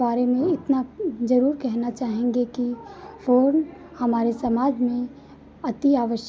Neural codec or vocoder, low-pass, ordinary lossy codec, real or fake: none; none; none; real